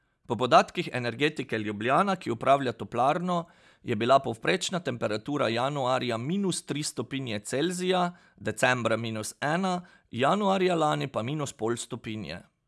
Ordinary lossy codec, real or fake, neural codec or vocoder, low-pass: none; real; none; none